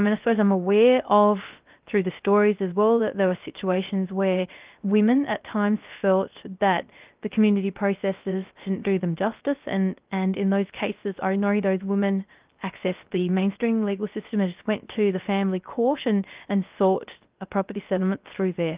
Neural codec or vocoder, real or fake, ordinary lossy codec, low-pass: codec, 16 kHz, 0.3 kbps, FocalCodec; fake; Opus, 32 kbps; 3.6 kHz